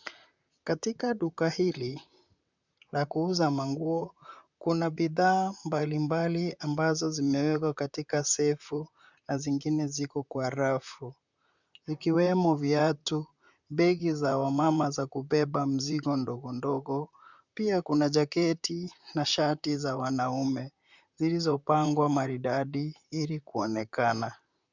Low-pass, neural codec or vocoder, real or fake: 7.2 kHz; vocoder, 44.1 kHz, 128 mel bands, Pupu-Vocoder; fake